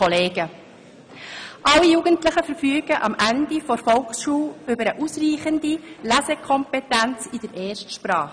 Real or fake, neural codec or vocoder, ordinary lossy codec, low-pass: real; none; none; 9.9 kHz